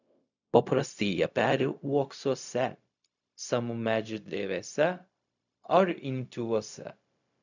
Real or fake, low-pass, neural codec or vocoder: fake; 7.2 kHz; codec, 16 kHz, 0.4 kbps, LongCat-Audio-Codec